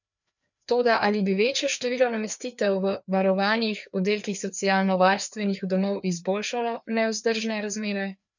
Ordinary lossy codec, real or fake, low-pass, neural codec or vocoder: none; fake; 7.2 kHz; codec, 16 kHz, 2 kbps, FreqCodec, larger model